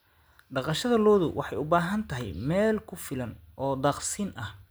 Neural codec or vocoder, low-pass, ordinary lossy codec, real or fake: none; none; none; real